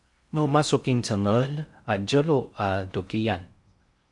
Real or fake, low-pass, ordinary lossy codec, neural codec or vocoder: fake; 10.8 kHz; MP3, 64 kbps; codec, 16 kHz in and 24 kHz out, 0.6 kbps, FocalCodec, streaming, 4096 codes